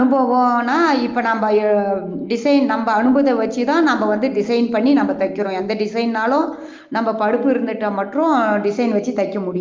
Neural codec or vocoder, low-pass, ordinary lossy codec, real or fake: none; 7.2 kHz; Opus, 32 kbps; real